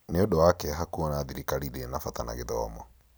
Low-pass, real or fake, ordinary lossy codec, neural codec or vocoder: none; real; none; none